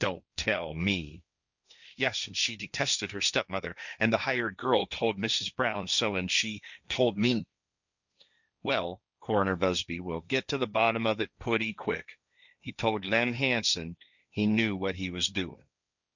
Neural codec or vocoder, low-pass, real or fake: codec, 16 kHz, 1.1 kbps, Voila-Tokenizer; 7.2 kHz; fake